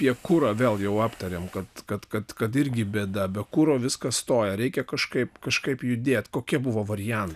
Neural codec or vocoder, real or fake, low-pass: none; real; 14.4 kHz